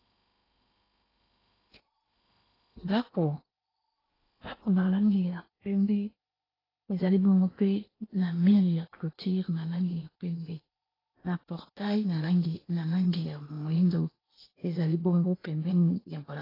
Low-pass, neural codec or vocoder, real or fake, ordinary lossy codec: 5.4 kHz; codec, 16 kHz in and 24 kHz out, 0.8 kbps, FocalCodec, streaming, 65536 codes; fake; AAC, 24 kbps